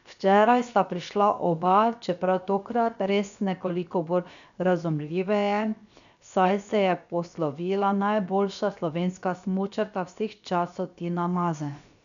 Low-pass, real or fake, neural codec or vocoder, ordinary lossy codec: 7.2 kHz; fake; codec, 16 kHz, 0.7 kbps, FocalCodec; none